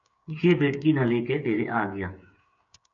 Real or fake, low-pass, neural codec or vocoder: fake; 7.2 kHz; codec, 16 kHz, 8 kbps, FreqCodec, smaller model